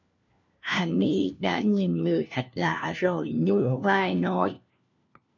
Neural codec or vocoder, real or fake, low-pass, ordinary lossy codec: codec, 16 kHz, 1 kbps, FunCodec, trained on LibriTTS, 50 frames a second; fake; 7.2 kHz; MP3, 64 kbps